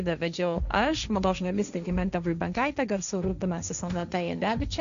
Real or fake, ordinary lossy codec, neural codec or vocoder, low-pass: fake; MP3, 96 kbps; codec, 16 kHz, 1.1 kbps, Voila-Tokenizer; 7.2 kHz